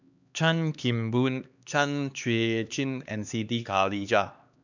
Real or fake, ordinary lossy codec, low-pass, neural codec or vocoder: fake; none; 7.2 kHz; codec, 16 kHz, 2 kbps, X-Codec, HuBERT features, trained on LibriSpeech